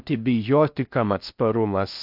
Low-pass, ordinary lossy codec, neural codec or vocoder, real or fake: 5.4 kHz; AAC, 48 kbps; codec, 16 kHz in and 24 kHz out, 0.6 kbps, FocalCodec, streaming, 4096 codes; fake